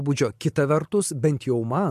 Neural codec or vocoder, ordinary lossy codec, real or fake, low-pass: none; MP3, 64 kbps; real; 14.4 kHz